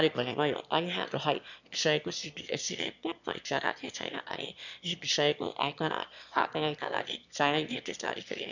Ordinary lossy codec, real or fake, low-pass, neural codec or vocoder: none; fake; 7.2 kHz; autoencoder, 22.05 kHz, a latent of 192 numbers a frame, VITS, trained on one speaker